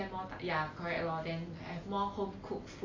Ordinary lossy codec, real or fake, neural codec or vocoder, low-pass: AAC, 32 kbps; real; none; 7.2 kHz